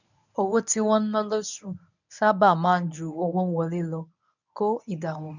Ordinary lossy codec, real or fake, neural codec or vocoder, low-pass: none; fake; codec, 24 kHz, 0.9 kbps, WavTokenizer, medium speech release version 1; 7.2 kHz